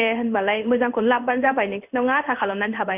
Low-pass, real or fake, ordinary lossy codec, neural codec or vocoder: 3.6 kHz; fake; none; codec, 16 kHz in and 24 kHz out, 1 kbps, XY-Tokenizer